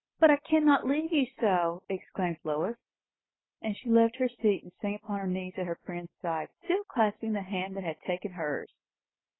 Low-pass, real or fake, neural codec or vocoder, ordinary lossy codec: 7.2 kHz; real; none; AAC, 16 kbps